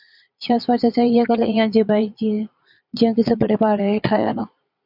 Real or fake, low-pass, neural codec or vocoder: fake; 5.4 kHz; vocoder, 22.05 kHz, 80 mel bands, Vocos